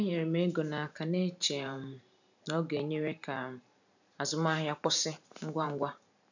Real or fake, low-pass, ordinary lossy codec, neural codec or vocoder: fake; 7.2 kHz; none; vocoder, 44.1 kHz, 128 mel bands every 512 samples, BigVGAN v2